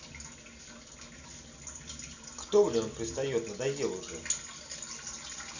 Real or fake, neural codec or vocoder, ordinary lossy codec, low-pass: real; none; none; 7.2 kHz